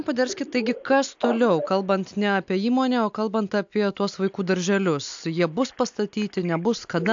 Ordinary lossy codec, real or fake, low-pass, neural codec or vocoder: AAC, 64 kbps; real; 7.2 kHz; none